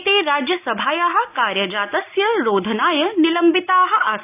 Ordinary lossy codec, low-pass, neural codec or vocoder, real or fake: none; 3.6 kHz; none; real